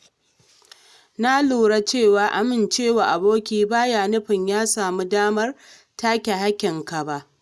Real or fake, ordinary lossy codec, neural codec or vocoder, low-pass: real; none; none; none